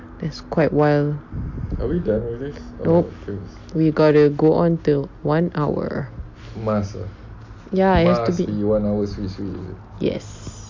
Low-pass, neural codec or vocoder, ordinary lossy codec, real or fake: 7.2 kHz; none; MP3, 48 kbps; real